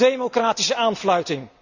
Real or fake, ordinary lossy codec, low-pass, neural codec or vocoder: real; none; 7.2 kHz; none